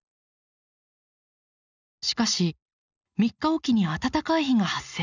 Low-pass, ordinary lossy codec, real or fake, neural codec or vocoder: 7.2 kHz; none; real; none